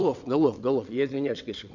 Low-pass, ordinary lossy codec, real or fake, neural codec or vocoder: 7.2 kHz; none; fake; vocoder, 22.05 kHz, 80 mel bands, WaveNeXt